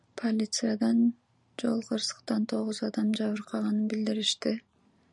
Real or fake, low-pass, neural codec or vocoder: fake; 10.8 kHz; vocoder, 44.1 kHz, 128 mel bands every 512 samples, BigVGAN v2